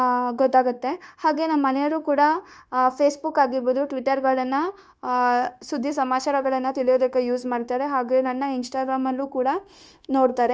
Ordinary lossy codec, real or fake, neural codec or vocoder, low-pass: none; fake; codec, 16 kHz, 0.9 kbps, LongCat-Audio-Codec; none